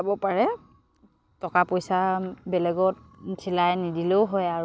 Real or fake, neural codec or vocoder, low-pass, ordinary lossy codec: real; none; none; none